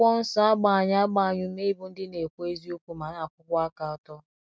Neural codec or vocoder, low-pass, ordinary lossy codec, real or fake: none; none; none; real